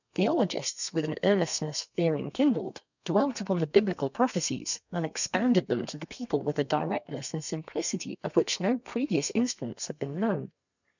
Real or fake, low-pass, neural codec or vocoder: fake; 7.2 kHz; codec, 32 kHz, 1.9 kbps, SNAC